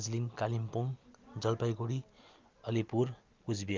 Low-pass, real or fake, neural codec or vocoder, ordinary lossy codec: 7.2 kHz; real; none; Opus, 24 kbps